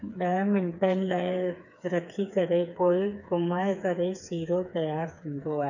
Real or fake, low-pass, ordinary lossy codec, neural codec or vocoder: fake; 7.2 kHz; none; codec, 16 kHz, 4 kbps, FreqCodec, smaller model